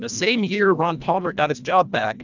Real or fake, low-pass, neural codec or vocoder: fake; 7.2 kHz; codec, 24 kHz, 1.5 kbps, HILCodec